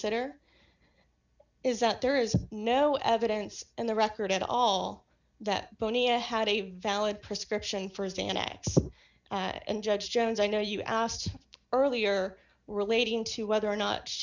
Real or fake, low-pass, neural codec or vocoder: real; 7.2 kHz; none